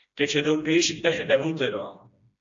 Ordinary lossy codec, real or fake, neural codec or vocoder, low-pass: AAC, 64 kbps; fake; codec, 16 kHz, 1 kbps, FreqCodec, smaller model; 7.2 kHz